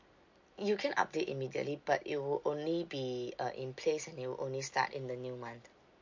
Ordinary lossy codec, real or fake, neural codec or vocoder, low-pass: MP3, 48 kbps; real; none; 7.2 kHz